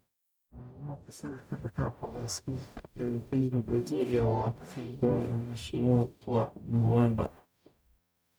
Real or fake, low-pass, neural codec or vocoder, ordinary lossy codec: fake; none; codec, 44.1 kHz, 0.9 kbps, DAC; none